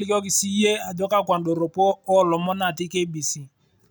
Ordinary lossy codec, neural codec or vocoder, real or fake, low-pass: none; none; real; none